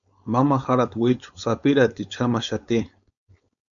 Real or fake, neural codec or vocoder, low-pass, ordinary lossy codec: fake; codec, 16 kHz, 4.8 kbps, FACodec; 7.2 kHz; MP3, 96 kbps